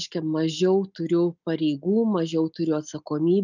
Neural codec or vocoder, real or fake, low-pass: none; real; 7.2 kHz